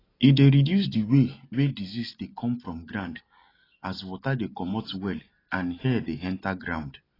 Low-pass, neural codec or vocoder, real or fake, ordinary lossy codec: 5.4 kHz; none; real; AAC, 24 kbps